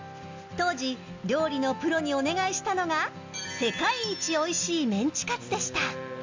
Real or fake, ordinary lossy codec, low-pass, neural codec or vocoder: real; MP3, 64 kbps; 7.2 kHz; none